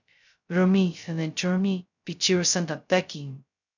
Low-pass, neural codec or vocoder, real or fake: 7.2 kHz; codec, 16 kHz, 0.2 kbps, FocalCodec; fake